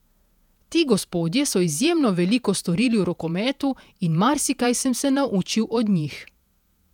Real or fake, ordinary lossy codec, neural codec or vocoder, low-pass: fake; none; vocoder, 48 kHz, 128 mel bands, Vocos; 19.8 kHz